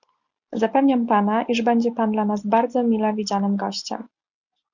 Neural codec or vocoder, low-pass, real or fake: none; 7.2 kHz; real